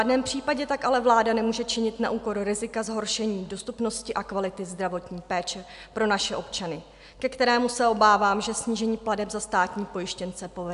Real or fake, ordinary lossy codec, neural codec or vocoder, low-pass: real; AAC, 96 kbps; none; 10.8 kHz